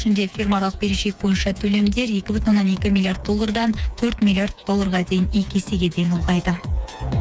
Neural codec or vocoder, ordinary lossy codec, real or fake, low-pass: codec, 16 kHz, 4 kbps, FreqCodec, smaller model; none; fake; none